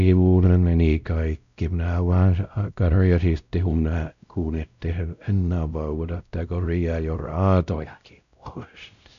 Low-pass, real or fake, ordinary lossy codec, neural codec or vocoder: 7.2 kHz; fake; none; codec, 16 kHz, 0.5 kbps, X-Codec, WavLM features, trained on Multilingual LibriSpeech